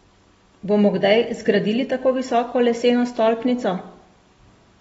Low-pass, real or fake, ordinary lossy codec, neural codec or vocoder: 19.8 kHz; real; AAC, 24 kbps; none